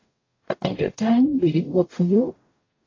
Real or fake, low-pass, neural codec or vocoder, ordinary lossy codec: fake; 7.2 kHz; codec, 44.1 kHz, 0.9 kbps, DAC; AAC, 32 kbps